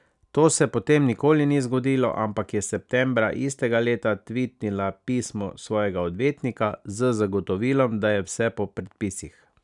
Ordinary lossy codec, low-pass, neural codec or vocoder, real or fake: none; 10.8 kHz; none; real